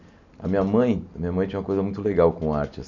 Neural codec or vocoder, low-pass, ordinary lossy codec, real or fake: none; 7.2 kHz; none; real